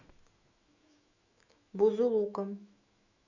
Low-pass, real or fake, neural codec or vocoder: 7.2 kHz; fake; autoencoder, 48 kHz, 128 numbers a frame, DAC-VAE, trained on Japanese speech